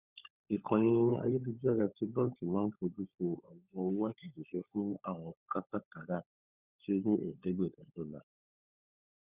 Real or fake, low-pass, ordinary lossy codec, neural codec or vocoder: fake; 3.6 kHz; Opus, 64 kbps; codec, 16 kHz, 16 kbps, FunCodec, trained on LibriTTS, 50 frames a second